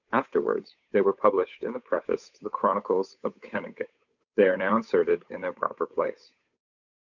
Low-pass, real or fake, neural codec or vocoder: 7.2 kHz; fake; codec, 16 kHz, 8 kbps, FunCodec, trained on Chinese and English, 25 frames a second